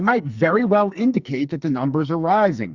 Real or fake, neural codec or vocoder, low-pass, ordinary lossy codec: fake; codec, 44.1 kHz, 2.6 kbps, SNAC; 7.2 kHz; Opus, 64 kbps